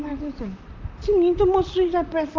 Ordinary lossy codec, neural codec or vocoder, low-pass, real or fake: Opus, 32 kbps; vocoder, 44.1 kHz, 128 mel bands, Pupu-Vocoder; 7.2 kHz; fake